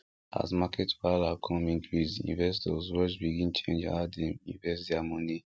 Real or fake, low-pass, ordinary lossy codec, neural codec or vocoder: real; none; none; none